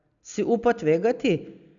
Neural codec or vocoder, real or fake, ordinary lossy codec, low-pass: none; real; none; 7.2 kHz